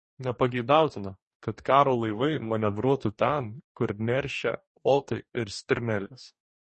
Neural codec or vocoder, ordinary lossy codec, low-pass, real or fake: codec, 44.1 kHz, 2.6 kbps, DAC; MP3, 32 kbps; 10.8 kHz; fake